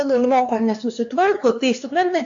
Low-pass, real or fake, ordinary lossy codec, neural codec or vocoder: 7.2 kHz; fake; AAC, 64 kbps; codec, 16 kHz, 2 kbps, X-Codec, HuBERT features, trained on LibriSpeech